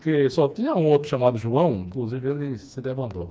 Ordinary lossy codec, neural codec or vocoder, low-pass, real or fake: none; codec, 16 kHz, 2 kbps, FreqCodec, smaller model; none; fake